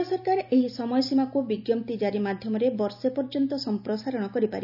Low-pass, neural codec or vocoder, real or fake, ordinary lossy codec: 5.4 kHz; none; real; none